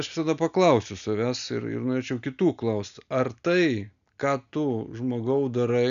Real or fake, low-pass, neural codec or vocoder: real; 7.2 kHz; none